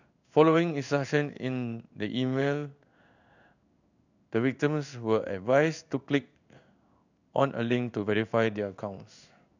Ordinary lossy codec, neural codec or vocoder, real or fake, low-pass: none; codec, 16 kHz in and 24 kHz out, 1 kbps, XY-Tokenizer; fake; 7.2 kHz